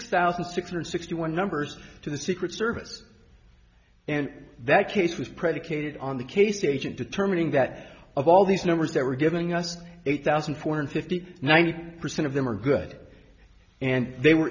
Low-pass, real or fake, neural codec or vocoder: 7.2 kHz; real; none